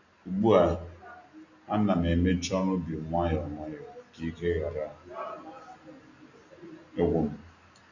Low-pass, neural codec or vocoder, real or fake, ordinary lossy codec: 7.2 kHz; none; real; none